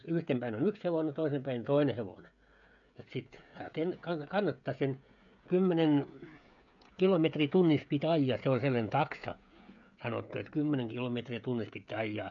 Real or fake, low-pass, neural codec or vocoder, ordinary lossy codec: fake; 7.2 kHz; codec, 16 kHz, 16 kbps, FreqCodec, smaller model; none